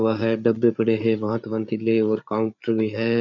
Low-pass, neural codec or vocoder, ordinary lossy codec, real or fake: 7.2 kHz; codec, 16 kHz, 8 kbps, FreqCodec, larger model; none; fake